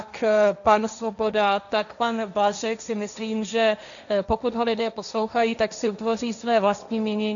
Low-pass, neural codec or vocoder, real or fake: 7.2 kHz; codec, 16 kHz, 1.1 kbps, Voila-Tokenizer; fake